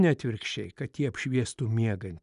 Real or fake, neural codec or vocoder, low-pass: real; none; 10.8 kHz